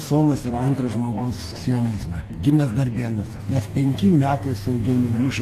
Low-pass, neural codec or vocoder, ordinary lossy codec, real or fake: 14.4 kHz; codec, 44.1 kHz, 2.6 kbps, DAC; AAC, 64 kbps; fake